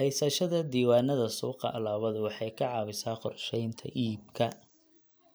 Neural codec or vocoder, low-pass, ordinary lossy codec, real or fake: none; none; none; real